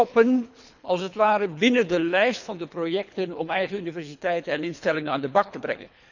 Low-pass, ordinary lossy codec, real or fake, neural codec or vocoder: 7.2 kHz; none; fake; codec, 24 kHz, 3 kbps, HILCodec